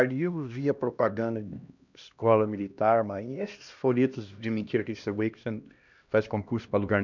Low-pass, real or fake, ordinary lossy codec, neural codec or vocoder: 7.2 kHz; fake; none; codec, 16 kHz, 1 kbps, X-Codec, HuBERT features, trained on LibriSpeech